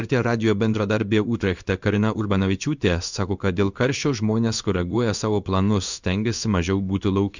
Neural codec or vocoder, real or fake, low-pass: codec, 16 kHz in and 24 kHz out, 1 kbps, XY-Tokenizer; fake; 7.2 kHz